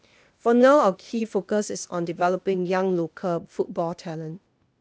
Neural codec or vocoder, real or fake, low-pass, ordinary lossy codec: codec, 16 kHz, 0.8 kbps, ZipCodec; fake; none; none